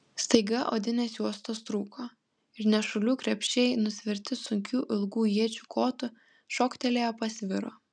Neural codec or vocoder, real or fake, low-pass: none; real; 9.9 kHz